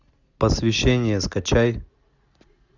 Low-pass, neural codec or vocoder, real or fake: 7.2 kHz; none; real